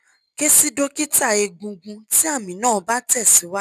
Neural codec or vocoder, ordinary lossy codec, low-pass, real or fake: none; none; 14.4 kHz; real